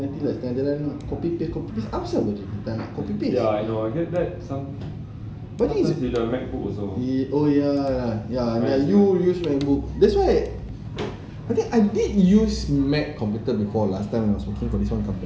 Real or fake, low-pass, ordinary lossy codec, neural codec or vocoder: real; none; none; none